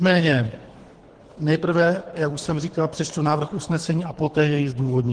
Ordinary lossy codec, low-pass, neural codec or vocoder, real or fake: Opus, 16 kbps; 9.9 kHz; codec, 24 kHz, 3 kbps, HILCodec; fake